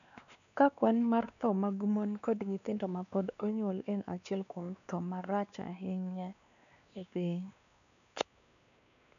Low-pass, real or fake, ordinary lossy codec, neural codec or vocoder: 7.2 kHz; fake; none; codec, 16 kHz, 2 kbps, X-Codec, WavLM features, trained on Multilingual LibriSpeech